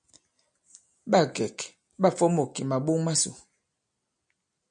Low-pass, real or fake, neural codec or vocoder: 9.9 kHz; real; none